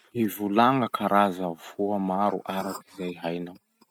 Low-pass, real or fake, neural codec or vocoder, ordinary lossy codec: 19.8 kHz; real; none; MP3, 96 kbps